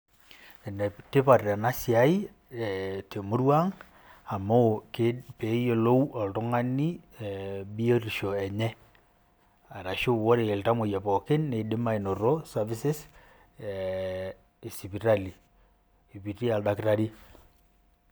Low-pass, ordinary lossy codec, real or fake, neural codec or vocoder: none; none; real; none